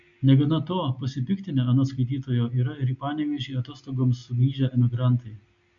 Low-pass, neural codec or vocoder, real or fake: 7.2 kHz; none; real